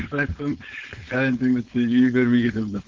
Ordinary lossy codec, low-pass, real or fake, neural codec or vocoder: Opus, 16 kbps; 7.2 kHz; fake; codec, 16 kHz, 4.8 kbps, FACodec